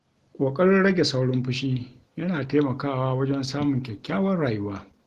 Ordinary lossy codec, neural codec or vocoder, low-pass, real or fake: Opus, 16 kbps; none; 14.4 kHz; real